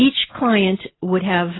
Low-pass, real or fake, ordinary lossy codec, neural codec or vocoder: 7.2 kHz; real; AAC, 16 kbps; none